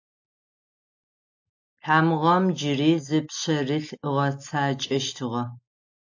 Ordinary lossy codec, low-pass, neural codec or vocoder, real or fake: AAC, 48 kbps; 7.2 kHz; none; real